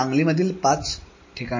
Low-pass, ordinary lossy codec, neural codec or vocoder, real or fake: 7.2 kHz; MP3, 32 kbps; none; real